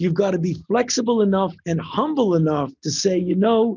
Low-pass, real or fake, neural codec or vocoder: 7.2 kHz; real; none